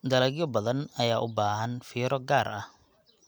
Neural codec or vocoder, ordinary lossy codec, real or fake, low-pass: none; none; real; none